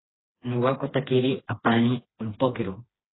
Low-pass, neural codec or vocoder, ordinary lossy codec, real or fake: 7.2 kHz; codec, 16 kHz, 2 kbps, FreqCodec, smaller model; AAC, 16 kbps; fake